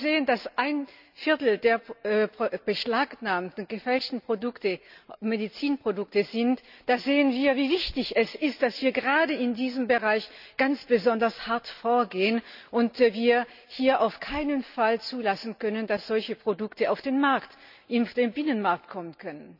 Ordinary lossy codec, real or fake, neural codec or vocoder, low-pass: none; real; none; 5.4 kHz